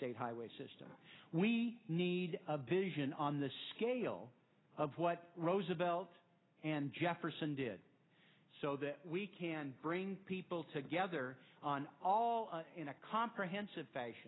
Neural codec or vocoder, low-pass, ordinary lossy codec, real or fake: none; 7.2 kHz; AAC, 16 kbps; real